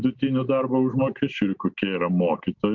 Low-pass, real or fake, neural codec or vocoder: 7.2 kHz; real; none